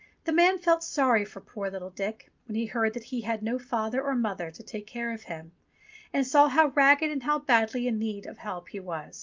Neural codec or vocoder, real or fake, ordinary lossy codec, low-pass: none; real; Opus, 24 kbps; 7.2 kHz